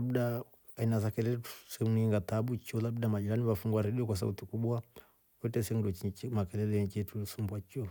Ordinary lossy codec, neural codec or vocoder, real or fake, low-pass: none; none; real; none